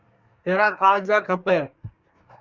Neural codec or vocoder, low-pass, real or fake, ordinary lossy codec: codec, 24 kHz, 1 kbps, SNAC; 7.2 kHz; fake; Opus, 64 kbps